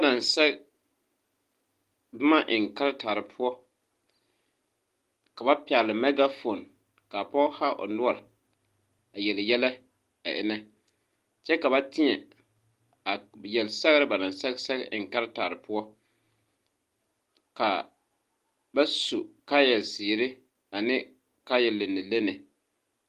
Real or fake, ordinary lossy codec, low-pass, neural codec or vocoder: real; Opus, 24 kbps; 14.4 kHz; none